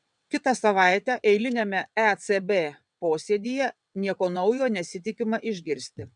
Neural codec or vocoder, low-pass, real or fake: vocoder, 22.05 kHz, 80 mel bands, WaveNeXt; 9.9 kHz; fake